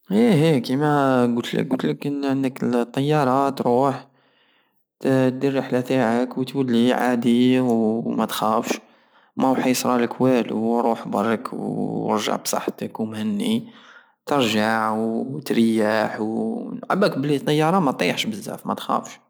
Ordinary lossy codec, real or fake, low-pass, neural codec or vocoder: none; real; none; none